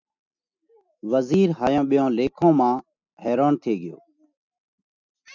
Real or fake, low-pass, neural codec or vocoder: real; 7.2 kHz; none